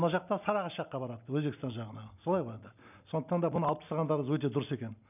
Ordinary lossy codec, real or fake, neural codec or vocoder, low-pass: none; real; none; 3.6 kHz